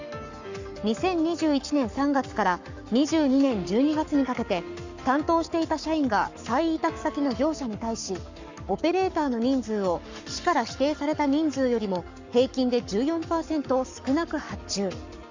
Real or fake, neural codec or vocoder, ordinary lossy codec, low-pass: fake; codec, 44.1 kHz, 7.8 kbps, Pupu-Codec; Opus, 64 kbps; 7.2 kHz